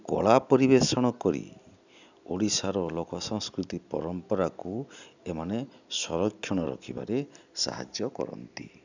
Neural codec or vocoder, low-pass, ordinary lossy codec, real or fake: none; 7.2 kHz; none; real